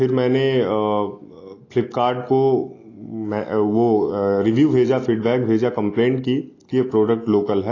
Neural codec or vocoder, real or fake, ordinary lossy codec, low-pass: none; real; AAC, 32 kbps; 7.2 kHz